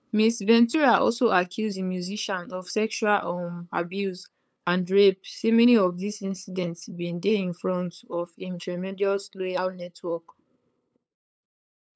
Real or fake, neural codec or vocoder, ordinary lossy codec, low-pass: fake; codec, 16 kHz, 8 kbps, FunCodec, trained on LibriTTS, 25 frames a second; none; none